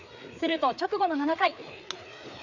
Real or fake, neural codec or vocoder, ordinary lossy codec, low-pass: fake; codec, 16 kHz, 4 kbps, FreqCodec, larger model; none; 7.2 kHz